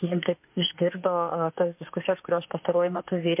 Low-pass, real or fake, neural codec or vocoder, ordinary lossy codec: 3.6 kHz; fake; autoencoder, 48 kHz, 32 numbers a frame, DAC-VAE, trained on Japanese speech; MP3, 32 kbps